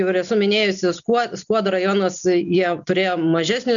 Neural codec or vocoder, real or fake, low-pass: none; real; 7.2 kHz